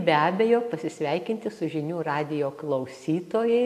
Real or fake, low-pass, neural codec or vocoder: real; 14.4 kHz; none